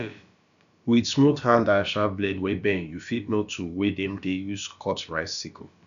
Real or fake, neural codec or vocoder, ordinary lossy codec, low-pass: fake; codec, 16 kHz, about 1 kbps, DyCAST, with the encoder's durations; none; 7.2 kHz